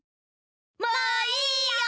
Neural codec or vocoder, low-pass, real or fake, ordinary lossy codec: none; none; real; none